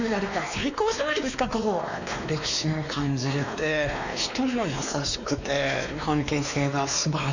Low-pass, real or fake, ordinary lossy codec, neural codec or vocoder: 7.2 kHz; fake; none; codec, 16 kHz, 2 kbps, X-Codec, WavLM features, trained on Multilingual LibriSpeech